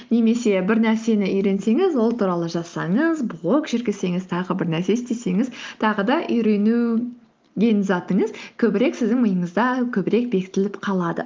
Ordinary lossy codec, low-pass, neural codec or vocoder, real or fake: Opus, 32 kbps; 7.2 kHz; none; real